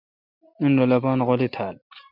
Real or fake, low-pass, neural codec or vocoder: real; 5.4 kHz; none